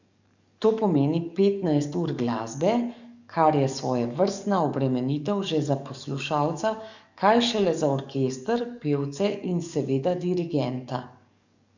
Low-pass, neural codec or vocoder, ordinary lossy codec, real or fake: 7.2 kHz; codec, 44.1 kHz, 7.8 kbps, DAC; none; fake